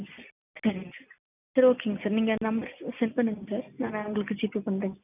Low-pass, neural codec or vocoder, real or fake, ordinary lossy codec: 3.6 kHz; none; real; none